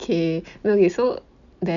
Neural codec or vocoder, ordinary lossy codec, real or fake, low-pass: none; none; real; 7.2 kHz